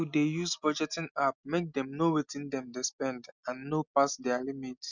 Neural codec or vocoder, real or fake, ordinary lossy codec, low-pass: none; real; none; 7.2 kHz